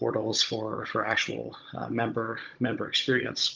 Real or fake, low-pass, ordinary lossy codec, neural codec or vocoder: fake; 7.2 kHz; Opus, 24 kbps; codec, 16 kHz, 16 kbps, FunCodec, trained on Chinese and English, 50 frames a second